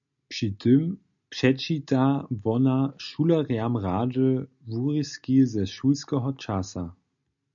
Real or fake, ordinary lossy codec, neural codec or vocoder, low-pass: real; MP3, 96 kbps; none; 7.2 kHz